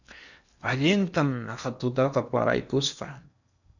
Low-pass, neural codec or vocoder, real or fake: 7.2 kHz; codec, 16 kHz in and 24 kHz out, 0.8 kbps, FocalCodec, streaming, 65536 codes; fake